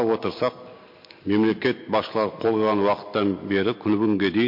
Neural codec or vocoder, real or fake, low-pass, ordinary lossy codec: none; real; 5.4 kHz; MP3, 32 kbps